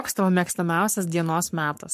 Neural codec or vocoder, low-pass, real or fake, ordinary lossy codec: codec, 44.1 kHz, 7.8 kbps, Pupu-Codec; 14.4 kHz; fake; MP3, 64 kbps